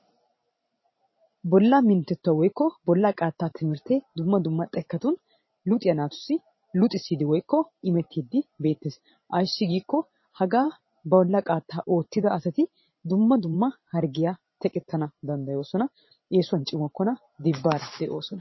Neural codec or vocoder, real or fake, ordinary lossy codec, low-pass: vocoder, 44.1 kHz, 128 mel bands every 512 samples, BigVGAN v2; fake; MP3, 24 kbps; 7.2 kHz